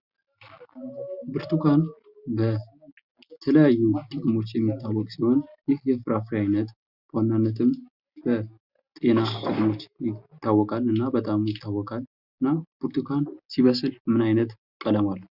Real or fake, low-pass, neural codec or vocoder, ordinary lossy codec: real; 5.4 kHz; none; Opus, 64 kbps